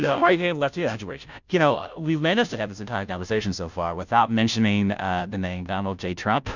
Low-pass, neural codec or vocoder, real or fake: 7.2 kHz; codec, 16 kHz, 0.5 kbps, FunCodec, trained on Chinese and English, 25 frames a second; fake